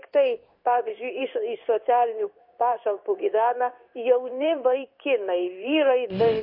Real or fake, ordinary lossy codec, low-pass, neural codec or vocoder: fake; MP3, 32 kbps; 5.4 kHz; codec, 16 kHz in and 24 kHz out, 1 kbps, XY-Tokenizer